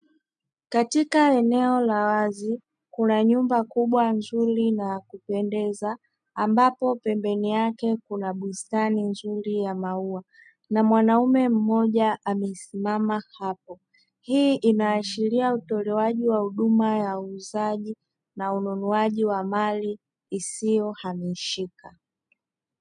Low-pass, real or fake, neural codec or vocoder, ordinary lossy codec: 9.9 kHz; real; none; MP3, 96 kbps